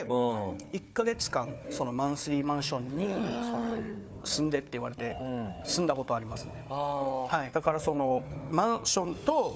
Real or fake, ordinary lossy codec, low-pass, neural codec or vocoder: fake; none; none; codec, 16 kHz, 4 kbps, FunCodec, trained on Chinese and English, 50 frames a second